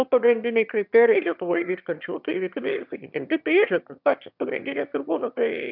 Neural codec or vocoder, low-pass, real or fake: autoencoder, 22.05 kHz, a latent of 192 numbers a frame, VITS, trained on one speaker; 5.4 kHz; fake